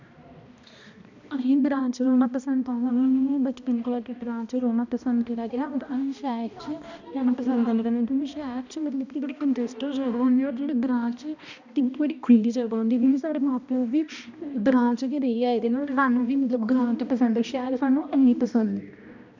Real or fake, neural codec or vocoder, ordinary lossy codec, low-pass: fake; codec, 16 kHz, 1 kbps, X-Codec, HuBERT features, trained on balanced general audio; none; 7.2 kHz